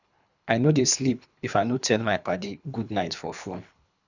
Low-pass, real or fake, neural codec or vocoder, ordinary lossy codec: 7.2 kHz; fake; codec, 24 kHz, 3 kbps, HILCodec; none